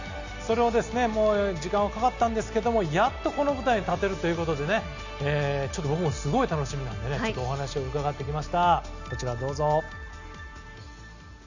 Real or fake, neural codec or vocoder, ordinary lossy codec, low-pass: real; none; none; 7.2 kHz